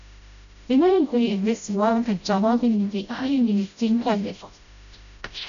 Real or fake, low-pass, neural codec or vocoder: fake; 7.2 kHz; codec, 16 kHz, 0.5 kbps, FreqCodec, smaller model